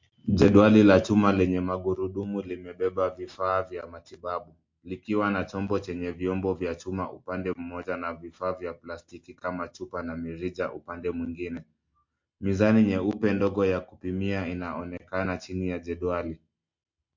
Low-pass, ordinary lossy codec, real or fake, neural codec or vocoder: 7.2 kHz; MP3, 48 kbps; real; none